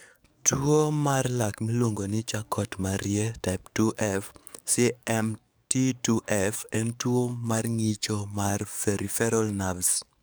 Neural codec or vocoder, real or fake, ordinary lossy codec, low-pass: codec, 44.1 kHz, 7.8 kbps, DAC; fake; none; none